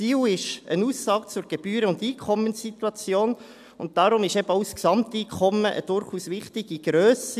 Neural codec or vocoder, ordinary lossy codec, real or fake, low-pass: none; AAC, 96 kbps; real; 14.4 kHz